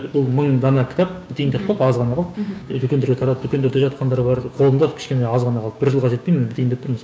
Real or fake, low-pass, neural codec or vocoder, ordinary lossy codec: fake; none; codec, 16 kHz, 6 kbps, DAC; none